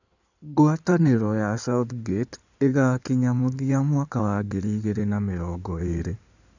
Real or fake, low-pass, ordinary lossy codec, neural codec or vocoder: fake; 7.2 kHz; none; codec, 16 kHz in and 24 kHz out, 2.2 kbps, FireRedTTS-2 codec